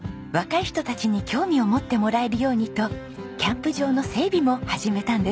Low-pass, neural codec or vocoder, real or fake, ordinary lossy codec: none; none; real; none